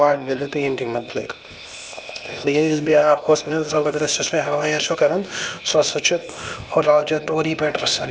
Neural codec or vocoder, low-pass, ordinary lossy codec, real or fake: codec, 16 kHz, 0.8 kbps, ZipCodec; none; none; fake